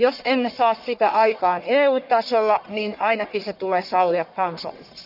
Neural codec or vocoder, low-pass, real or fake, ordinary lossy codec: codec, 44.1 kHz, 1.7 kbps, Pupu-Codec; 5.4 kHz; fake; none